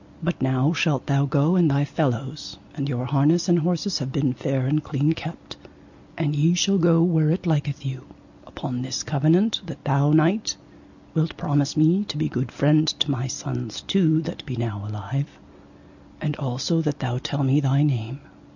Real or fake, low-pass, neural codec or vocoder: real; 7.2 kHz; none